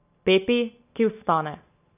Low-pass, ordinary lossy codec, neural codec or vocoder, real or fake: 3.6 kHz; none; none; real